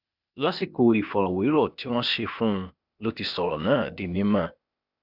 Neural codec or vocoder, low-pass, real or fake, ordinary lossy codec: codec, 16 kHz, 0.8 kbps, ZipCodec; 5.4 kHz; fake; none